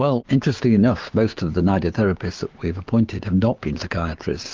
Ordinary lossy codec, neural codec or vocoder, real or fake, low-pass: Opus, 32 kbps; codec, 16 kHz, 6 kbps, DAC; fake; 7.2 kHz